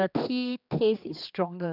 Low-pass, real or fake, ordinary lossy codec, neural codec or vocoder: 5.4 kHz; fake; none; codec, 16 kHz, 2 kbps, X-Codec, HuBERT features, trained on general audio